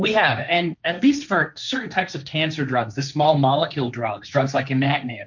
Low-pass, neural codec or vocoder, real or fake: 7.2 kHz; codec, 16 kHz, 1.1 kbps, Voila-Tokenizer; fake